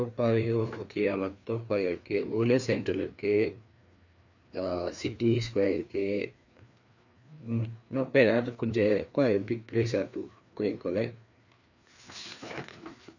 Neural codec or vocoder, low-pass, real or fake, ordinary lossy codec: codec, 16 kHz, 2 kbps, FreqCodec, larger model; 7.2 kHz; fake; none